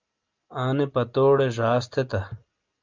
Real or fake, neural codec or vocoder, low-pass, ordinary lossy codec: real; none; 7.2 kHz; Opus, 24 kbps